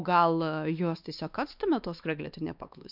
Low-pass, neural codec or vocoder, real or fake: 5.4 kHz; codec, 16 kHz, 2 kbps, X-Codec, WavLM features, trained on Multilingual LibriSpeech; fake